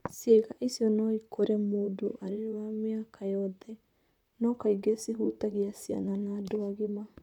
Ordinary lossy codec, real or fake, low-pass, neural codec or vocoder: none; fake; 19.8 kHz; vocoder, 44.1 kHz, 128 mel bands, Pupu-Vocoder